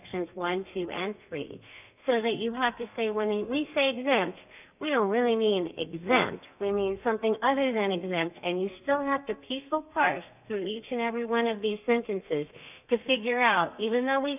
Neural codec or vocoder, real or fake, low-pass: codec, 32 kHz, 1.9 kbps, SNAC; fake; 3.6 kHz